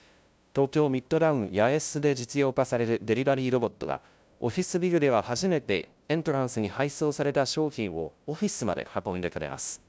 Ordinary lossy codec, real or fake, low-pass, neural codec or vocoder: none; fake; none; codec, 16 kHz, 0.5 kbps, FunCodec, trained on LibriTTS, 25 frames a second